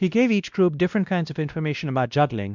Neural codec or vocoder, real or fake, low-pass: codec, 16 kHz, 1 kbps, X-Codec, WavLM features, trained on Multilingual LibriSpeech; fake; 7.2 kHz